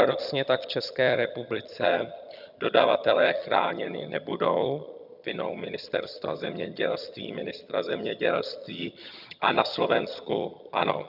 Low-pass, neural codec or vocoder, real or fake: 5.4 kHz; vocoder, 22.05 kHz, 80 mel bands, HiFi-GAN; fake